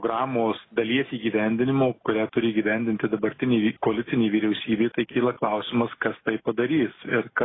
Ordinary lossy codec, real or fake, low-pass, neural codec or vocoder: AAC, 16 kbps; real; 7.2 kHz; none